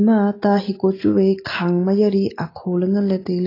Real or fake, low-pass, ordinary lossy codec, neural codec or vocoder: real; 5.4 kHz; AAC, 24 kbps; none